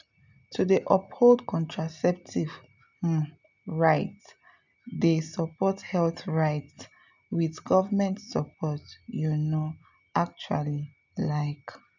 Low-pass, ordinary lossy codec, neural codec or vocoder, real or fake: 7.2 kHz; none; none; real